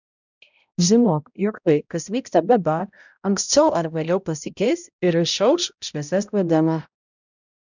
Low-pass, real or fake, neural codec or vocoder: 7.2 kHz; fake; codec, 16 kHz, 0.5 kbps, X-Codec, HuBERT features, trained on balanced general audio